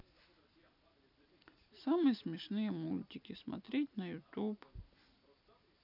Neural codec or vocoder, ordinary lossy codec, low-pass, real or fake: none; none; 5.4 kHz; real